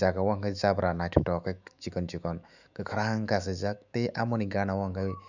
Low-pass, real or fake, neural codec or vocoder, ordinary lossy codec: 7.2 kHz; fake; autoencoder, 48 kHz, 128 numbers a frame, DAC-VAE, trained on Japanese speech; none